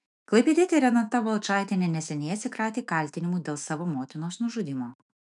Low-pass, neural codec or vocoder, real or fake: 10.8 kHz; autoencoder, 48 kHz, 128 numbers a frame, DAC-VAE, trained on Japanese speech; fake